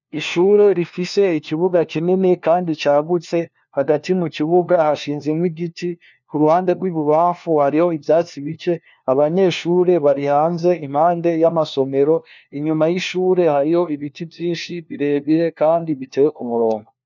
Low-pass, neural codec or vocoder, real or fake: 7.2 kHz; codec, 16 kHz, 1 kbps, FunCodec, trained on LibriTTS, 50 frames a second; fake